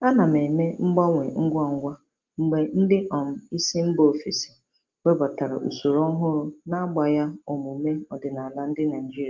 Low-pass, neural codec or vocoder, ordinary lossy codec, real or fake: 7.2 kHz; none; Opus, 32 kbps; real